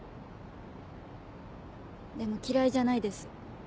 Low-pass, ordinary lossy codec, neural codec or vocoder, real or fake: none; none; none; real